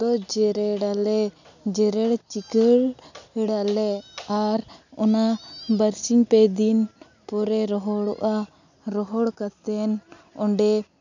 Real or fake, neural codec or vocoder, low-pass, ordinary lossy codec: real; none; 7.2 kHz; none